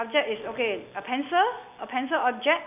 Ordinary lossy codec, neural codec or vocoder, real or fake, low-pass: none; none; real; 3.6 kHz